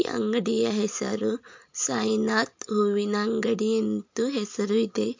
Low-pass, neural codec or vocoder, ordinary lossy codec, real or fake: 7.2 kHz; none; MP3, 48 kbps; real